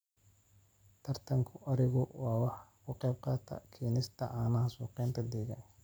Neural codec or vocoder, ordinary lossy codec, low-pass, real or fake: none; none; none; real